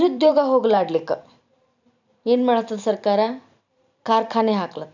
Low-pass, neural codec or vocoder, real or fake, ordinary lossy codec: 7.2 kHz; vocoder, 44.1 kHz, 128 mel bands every 512 samples, BigVGAN v2; fake; none